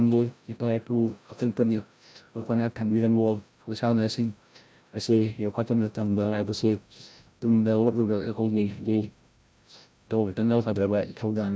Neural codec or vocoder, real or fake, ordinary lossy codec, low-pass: codec, 16 kHz, 0.5 kbps, FreqCodec, larger model; fake; none; none